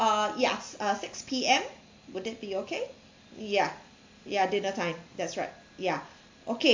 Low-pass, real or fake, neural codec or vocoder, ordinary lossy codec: 7.2 kHz; real; none; MP3, 48 kbps